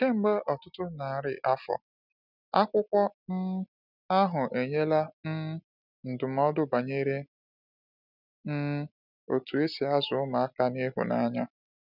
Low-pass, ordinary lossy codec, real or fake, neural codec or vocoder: 5.4 kHz; none; real; none